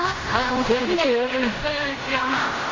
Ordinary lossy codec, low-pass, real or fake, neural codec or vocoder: AAC, 32 kbps; 7.2 kHz; fake; codec, 16 kHz in and 24 kHz out, 0.4 kbps, LongCat-Audio-Codec, fine tuned four codebook decoder